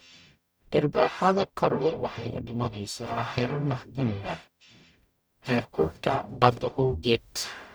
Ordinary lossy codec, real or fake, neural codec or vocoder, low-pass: none; fake; codec, 44.1 kHz, 0.9 kbps, DAC; none